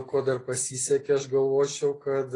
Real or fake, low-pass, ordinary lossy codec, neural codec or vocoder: real; 10.8 kHz; AAC, 32 kbps; none